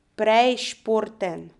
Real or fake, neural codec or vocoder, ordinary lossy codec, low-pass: real; none; none; 10.8 kHz